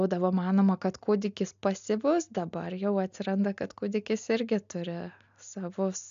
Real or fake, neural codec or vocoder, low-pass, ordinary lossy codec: real; none; 7.2 kHz; AAC, 64 kbps